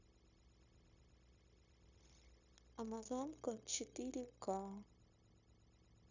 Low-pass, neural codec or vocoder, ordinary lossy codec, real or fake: 7.2 kHz; codec, 16 kHz, 0.9 kbps, LongCat-Audio-Codec; none; fake